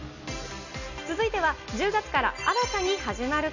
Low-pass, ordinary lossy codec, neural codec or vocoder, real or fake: 7.2 kHz; none; none; real